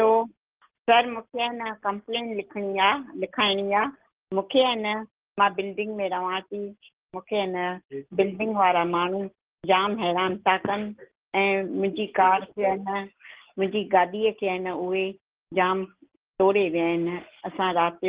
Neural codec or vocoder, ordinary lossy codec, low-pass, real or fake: none; Opus, 16 kbps; 3.6 kHz; real